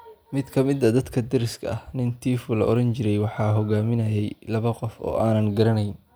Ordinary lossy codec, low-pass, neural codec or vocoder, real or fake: none; none; none; real